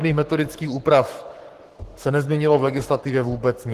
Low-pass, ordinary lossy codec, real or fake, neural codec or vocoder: 14.4 kHz; Opus, 16 kbps; fake; codec, 44.1 kHz, 7.8 kbps, Pupu-Codec